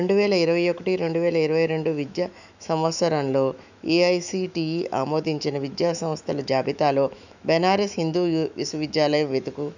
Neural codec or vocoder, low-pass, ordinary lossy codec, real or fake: autoencoder, 48 kHz, 128 numbers a frame, DAC-VAE, trained on Japanese speech; 7.2 kHz; none; fake